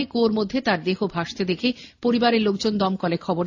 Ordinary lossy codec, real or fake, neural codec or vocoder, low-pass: AAC, 48 kbps; real; none; 7.2 kHz